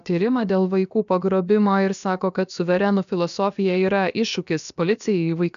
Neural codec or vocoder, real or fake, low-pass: codec, 16 kHz, about 1 kbps, DyCAST, with the encoder's durations; fake; 7.2 kHz